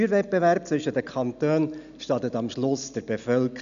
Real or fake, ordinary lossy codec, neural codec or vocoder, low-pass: real; none; none; 7.2 kHz